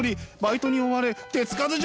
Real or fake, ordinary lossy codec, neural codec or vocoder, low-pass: real; none; none; none